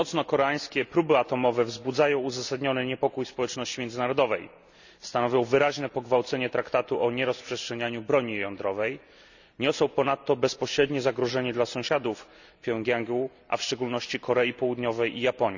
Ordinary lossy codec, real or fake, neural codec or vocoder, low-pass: none; real; none; 7.2 kHz